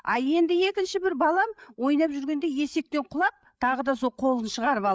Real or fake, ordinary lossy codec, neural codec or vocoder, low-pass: fake; none; codec, 16 kHz, 8 kbps, FreqCodec, larger model; none